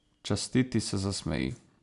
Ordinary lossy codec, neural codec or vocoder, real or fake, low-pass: none; none; real; 10.8 kHz